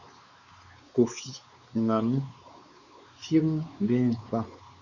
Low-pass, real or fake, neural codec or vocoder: 7.2 kHz; fake; codec, 16 kHz, 4 kbps, X-Codec, WavLM features, trained on Multilingual LibriSpeech